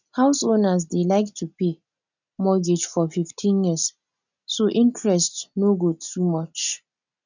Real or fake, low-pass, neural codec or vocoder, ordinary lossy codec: real; 7.2 kHz; none; none